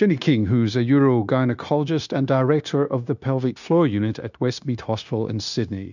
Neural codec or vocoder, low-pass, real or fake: codec, 16 kHz, 0.9 kbps, LongCat-Audio-Codec; 7.2 kHz; fake